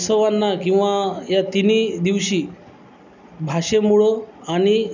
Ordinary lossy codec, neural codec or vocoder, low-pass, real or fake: none; none; 7.2 kHz; real